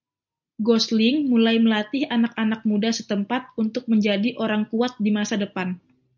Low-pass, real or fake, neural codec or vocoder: 7.2 kHz; real; none